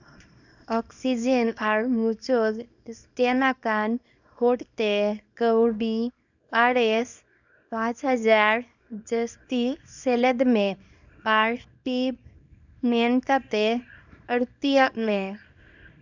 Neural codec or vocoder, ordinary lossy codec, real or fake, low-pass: codec, 24 kHz, 0.9 kbps, WavTokenizer, small release; none; fake; 7.2 kHz